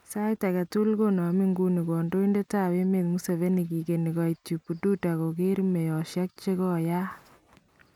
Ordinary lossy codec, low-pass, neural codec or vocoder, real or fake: none; 19.8 kHz; none; real